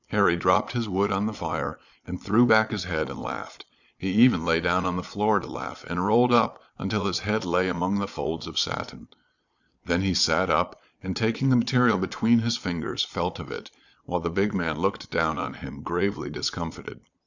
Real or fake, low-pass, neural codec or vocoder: fake; 7.2 kHz; vocoder, 22.05 kHz, 80 mel bands, Vocos